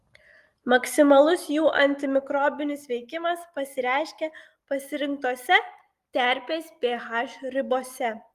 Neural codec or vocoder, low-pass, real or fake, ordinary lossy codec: none; 14.4 kHz; real; Opus, 24 kbps